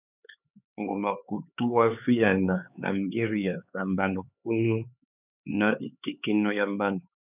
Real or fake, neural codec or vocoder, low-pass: fake; codec, 16 kHz, 4 kbps, X-Codec, HuBERT features, trained on LibriSpeech; 3.6 kHz